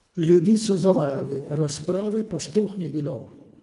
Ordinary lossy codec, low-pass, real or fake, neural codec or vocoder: none; 10.8 kHz; fake; codec, 24 kHz, 1.5 kbps, HILCodec